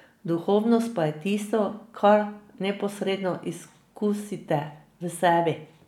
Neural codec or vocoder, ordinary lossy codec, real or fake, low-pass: none; none; real; 19.8 kHz